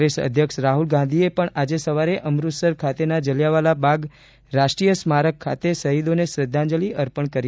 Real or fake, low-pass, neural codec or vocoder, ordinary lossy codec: real; none; none; none